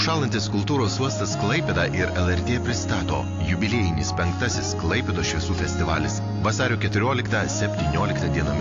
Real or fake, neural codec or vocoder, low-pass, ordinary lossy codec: real; none; 7.2 kHz; AAC, 48 kbps